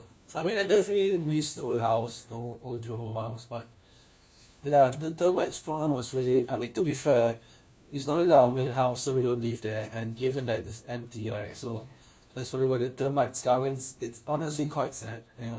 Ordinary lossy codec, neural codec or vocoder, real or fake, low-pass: none; codec, 16 kHz, 1 kbps, FunCodec, trained on LibriTTS, 50 frames a second; fake; none